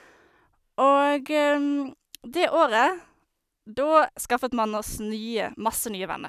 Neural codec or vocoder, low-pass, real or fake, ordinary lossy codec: none; 14.4 kHz; real; none